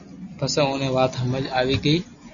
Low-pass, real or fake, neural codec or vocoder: 7.2 kHz; real; none